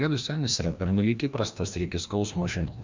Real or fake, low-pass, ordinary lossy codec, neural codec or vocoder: fake; 7.2 kHz; MP3, 64 kbps; codec, 16 kHz, 1 kbps, FreqCodec, larger model